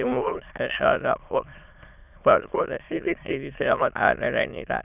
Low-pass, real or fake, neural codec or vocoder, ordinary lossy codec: 3.6 kHz; fake; autoencoder, 22.05 kHz, a latent of 192 numbers a frame, VITS, trained on many speakers; none